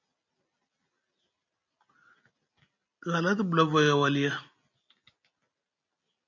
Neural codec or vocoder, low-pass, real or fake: none; 7.2 kHz; real